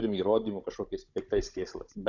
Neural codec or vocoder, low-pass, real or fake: codec, 16 kHz, 16 kbps, FunCodec, trained on Chinese and English, 50 frames a second; 7.2 kHz; fake